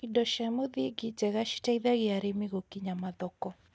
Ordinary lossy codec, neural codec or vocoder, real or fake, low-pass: none; none; real; none